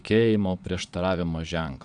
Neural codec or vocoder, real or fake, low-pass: vocoder, 22.05 kHz, 80 mel bands, Vocos; fake; 9.9 kHz